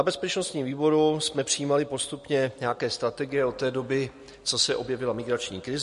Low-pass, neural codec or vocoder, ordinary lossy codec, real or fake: 14.4 kHz; none; MP3, 48 kbps; real